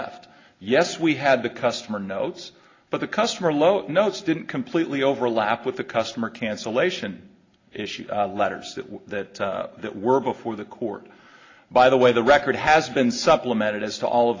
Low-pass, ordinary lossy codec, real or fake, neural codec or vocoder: 7.2 kHz; AAC, 32 kbps; real; none